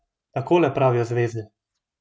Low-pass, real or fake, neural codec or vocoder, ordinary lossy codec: none; real; none; none